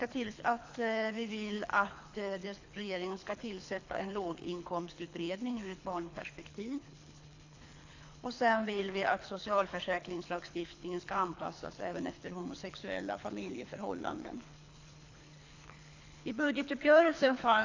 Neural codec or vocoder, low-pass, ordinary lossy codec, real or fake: codec, 24 kHz, 3 kbps, HILCodec; 7.2 kHz; AAC, 48 kbps; fake